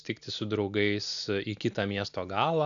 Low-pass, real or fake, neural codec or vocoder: 7.2 kHz; real; none